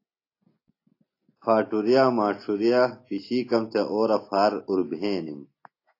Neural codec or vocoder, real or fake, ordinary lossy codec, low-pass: none; real; AAC, 32 kbps; 5.4 kHz